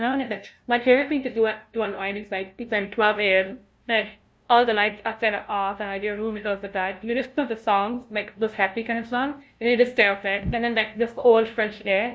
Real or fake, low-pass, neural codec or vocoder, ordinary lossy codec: fake; none; codec, 16 kHz, 0.5 kbps, FunCodec, trained on LibriTTS, 25 frames a second; none